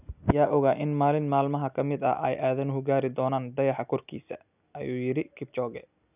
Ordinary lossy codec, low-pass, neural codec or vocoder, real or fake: none; 3.6 kHz; none; real